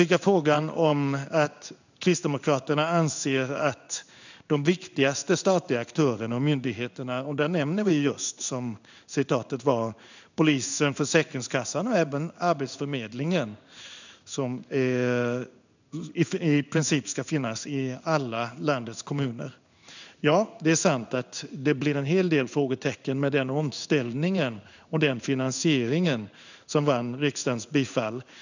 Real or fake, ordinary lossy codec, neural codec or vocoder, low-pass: fake; none; codec, 16 kHz in and 24 kHz out, 1 kbps, XY-Tokenizer; 7.2 kHz